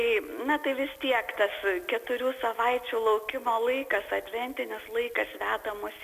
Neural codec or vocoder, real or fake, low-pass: none; real; 14.4 kHz